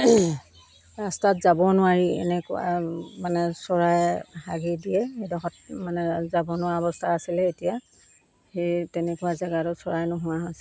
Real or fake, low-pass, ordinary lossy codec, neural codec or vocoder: real; none; none; none